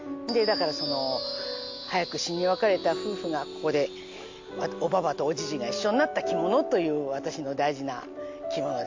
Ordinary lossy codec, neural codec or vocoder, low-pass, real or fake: MP3, 48 kbps; none; 7.2 kHz; real